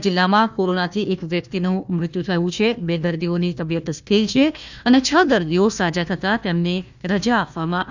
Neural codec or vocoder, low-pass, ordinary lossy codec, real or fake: codec, 16 kHz, 1 kbps, FunCodec, trained on Chinese and English, 50 frames a second; 7.2 kHz; none; fake